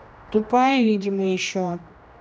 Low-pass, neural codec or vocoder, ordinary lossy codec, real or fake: none; codec, 16 kHz, 1 kbps, X-Codec, HuBERT features, trained on general audio; none; fake